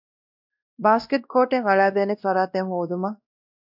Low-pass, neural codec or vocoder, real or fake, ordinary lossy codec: 5.4 kHz; codec, 16 kHz, 1 kbps, X-Codec, WavLM features, trained on Multilingual LibriSpeech; fake; AAC, 48 kbps